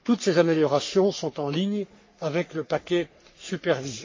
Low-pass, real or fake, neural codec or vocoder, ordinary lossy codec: 7.2 kHz; fake; codec, 44.1 kHz, 3.4 kbps, Pupu-Codec; MP3, 32 kbps